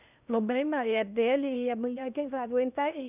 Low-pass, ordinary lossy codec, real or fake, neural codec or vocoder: 3.6 kHz; none; fake; codec, 16 kHz in and 24 kHz out, 0.8 kbps, FocalCodec, streaming, 65536 codes